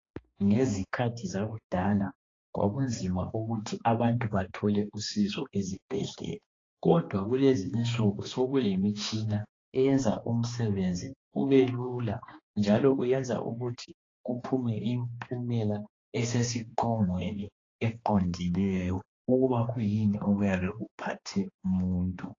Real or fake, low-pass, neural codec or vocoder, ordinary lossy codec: fake; 7.2 kHz; codec, 16 kHz, 2 kbps, X-Codec, HuBERT features, trained on balanced general audio; AAC, 32 kbps